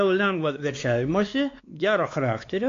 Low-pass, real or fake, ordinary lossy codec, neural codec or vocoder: 7.2 kHz; fake; AAC, 48 kbps; codec, 16 kHz, 2 kbps, X-Codec, WavLM features, trained on Multilingual LibriSpeech